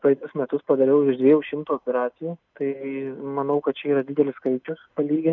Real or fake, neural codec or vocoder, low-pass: real; none; 7.2 kHz